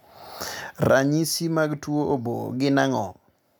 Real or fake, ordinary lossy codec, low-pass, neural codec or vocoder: real; none; none; none